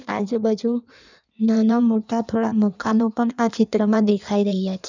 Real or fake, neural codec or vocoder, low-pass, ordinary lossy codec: fake; codec, 16 kHz in and 24 kHz out, 1.1 kbps, FireRedTTS-2 codec; 7.2 kHz; none